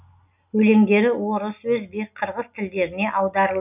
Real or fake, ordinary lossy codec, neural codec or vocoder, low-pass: real; none; none; 3.6 kHz